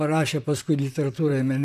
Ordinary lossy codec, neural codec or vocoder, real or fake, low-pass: AAC, 96 kbps; vocoder, 44.1 kHz, 128 mel bands, Pupu-Vocoder; fake; 14.4 kHz